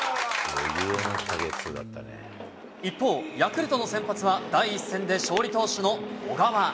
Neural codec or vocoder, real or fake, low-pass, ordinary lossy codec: none; real; none; none